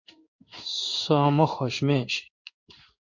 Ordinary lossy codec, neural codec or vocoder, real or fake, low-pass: MP3, 48 kbps; codec, 16 kHz in and 24 kHz out, 1 kbps, XY-Tokenizer; fake; 7.2 kHz